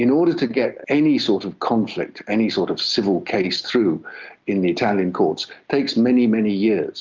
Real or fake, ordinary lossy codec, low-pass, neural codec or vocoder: real; Opus, 24 kbps; 7.2 kHz; none